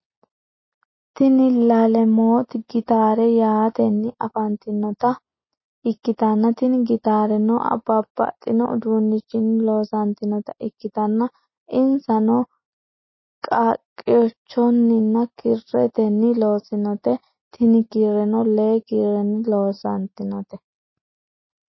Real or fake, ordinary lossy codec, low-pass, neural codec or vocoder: real; MP3, 24 kbps; 7.2 kHz; none